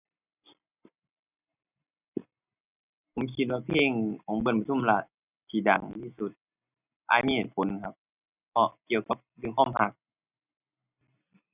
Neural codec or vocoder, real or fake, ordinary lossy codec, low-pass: none; real; none; 3.6 kHz